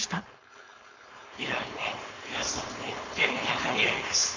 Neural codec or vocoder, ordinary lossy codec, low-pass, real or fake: codec, 16 kHz, 4.8 kbps, FACodec; AAC, 32 kbps; 7.2 kHz; fake